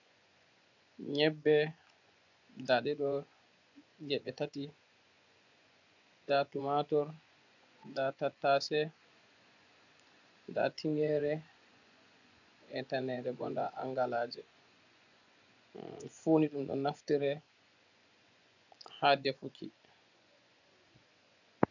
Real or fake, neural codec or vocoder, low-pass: fake; vocoder, 24 kHz, 100 mel bands, Vocos; 7.2 kHz